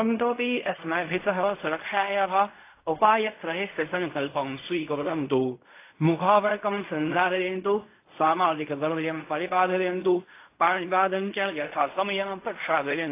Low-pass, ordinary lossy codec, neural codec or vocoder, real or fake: 3.6 kHz; AAC, 24 kbps; codec, 16 kHz in and 24 kHz out, 0.4 kbps, LongCat-Audio-Codec, fine tuned four codebook decoder; fake